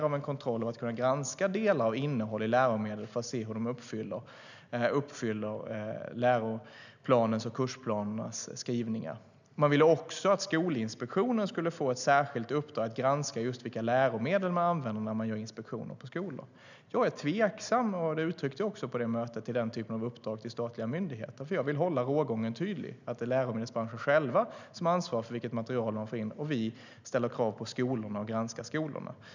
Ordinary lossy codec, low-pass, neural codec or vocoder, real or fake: none; 7.2 kHz; none; real